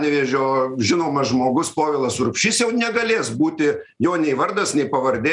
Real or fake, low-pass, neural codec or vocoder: real; 10.8 kHz; none